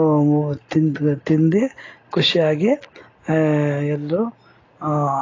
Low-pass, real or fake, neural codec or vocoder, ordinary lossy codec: 7.2 kHz; real; none; AAC, 32 kbps